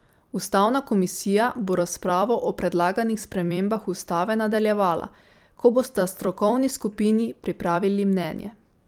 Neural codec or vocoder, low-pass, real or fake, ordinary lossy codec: vocoder, 44.1 kHz, 128 mel bands every 256 samples, BigVGAN v2; 19.8 kHz; fake; Opus, 32 kbps